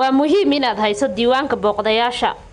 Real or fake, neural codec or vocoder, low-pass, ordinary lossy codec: real; none; 10.8 kHz; none